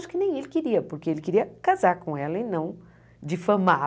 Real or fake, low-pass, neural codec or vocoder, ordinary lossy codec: real; none; none; none